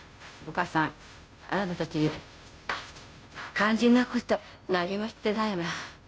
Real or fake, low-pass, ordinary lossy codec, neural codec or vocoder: fake; none; none; codec, 16 kHz, 0.5 kbps, FunCodec, trained on Chinese and English, 25 frames a second